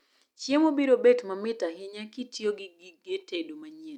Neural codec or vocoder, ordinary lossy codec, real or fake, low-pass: none; none; real; 19.8 kHz